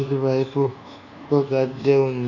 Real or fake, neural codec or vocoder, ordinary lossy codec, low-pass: fake; codec, 24 kHz, 1.2 kbps, DualCodec; none; 7.2 kHz